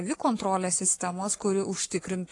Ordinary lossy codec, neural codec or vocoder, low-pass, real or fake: AAC, 48 kbps; codec, 44.1 kHz, 7.8 kbps, Pupu-Codec; 10.8 kHz; fake